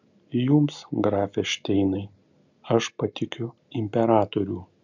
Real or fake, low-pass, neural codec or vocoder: real; 7.2 kHz; none